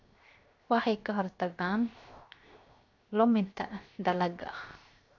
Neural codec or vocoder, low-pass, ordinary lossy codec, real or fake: codec, 16 kHz, 0.7 kbps, FocalCodec; 7.2 kHz; AAC, 48 kbps; fake